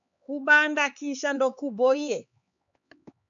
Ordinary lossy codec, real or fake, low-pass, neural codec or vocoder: MP3, 64 kbps; fake; 7.2 kHz; codec, 16 kHz, 4 kbps, X-Codec, HuBERT features, trained on LibriSpeech